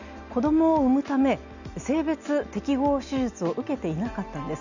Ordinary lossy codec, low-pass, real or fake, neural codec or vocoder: none; 7.2 kHz; real; none